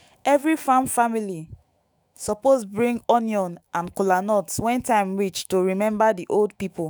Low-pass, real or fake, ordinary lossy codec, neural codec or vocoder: none; fake; none; autoencoder, 48 kHz, 128 numbers a frame, DAC-VAE, trained on Japanese speech